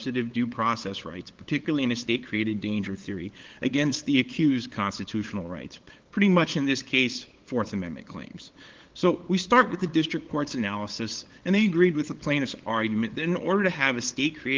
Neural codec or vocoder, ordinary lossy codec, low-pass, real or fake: codec, 16 kHz, 8 kbps, FunCodec, trained on LibriTTS, 25 frames a second; Opus, 16 kbps; 7.2 kHz; fake